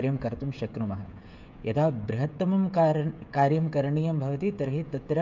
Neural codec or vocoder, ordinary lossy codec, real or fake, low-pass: codec, 16 kHz, 16 kbps, FreqCodec, smaller model; MP3, 64 kbps; fake; 7.2 kHz